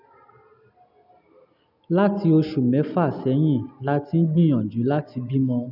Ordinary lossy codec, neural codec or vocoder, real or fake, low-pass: none; none; real; 5.4 kHz